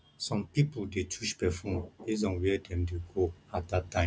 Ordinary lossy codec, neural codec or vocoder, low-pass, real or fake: none; none; none; real